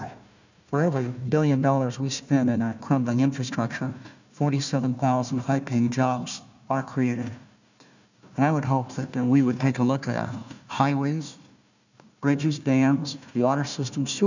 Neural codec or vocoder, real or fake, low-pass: codec, 16 kHz, 1 kbps, FunCodec, trained on Chinese and English, 50 frames a second; fake; 7.2 kHz